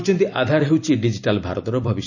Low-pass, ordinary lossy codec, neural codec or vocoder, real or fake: 7.2 kHz; none; vocoder, 44.1 kHz, 128 mel bands every 256 samples, BigVGAN v2; fake